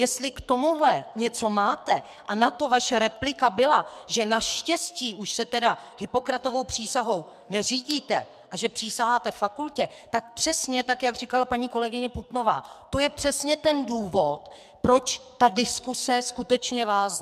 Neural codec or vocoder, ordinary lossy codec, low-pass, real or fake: codec, 44.1 kHz, 2.6 kbps, SNAC; MP3, 96 kbps; 14.4 kHz; fake